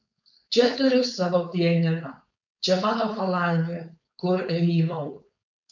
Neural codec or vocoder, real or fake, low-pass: codec, 16 kHz, 4.8 kbps, FACodec; fake; 7.2 kHz